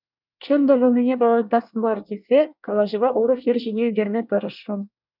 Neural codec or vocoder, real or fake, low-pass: codec, 24 kHz, 1 kbps, SNAC; fake; 5.4 kHz